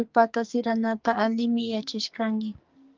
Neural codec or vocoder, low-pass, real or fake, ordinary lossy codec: codec, 44.1 kHz, 2.6 kbps, SNAC; 7.2 kHz; fake; Opus, 24 kbps